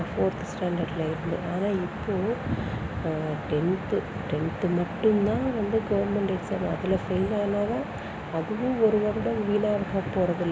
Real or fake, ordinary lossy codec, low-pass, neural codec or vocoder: real; none; none; none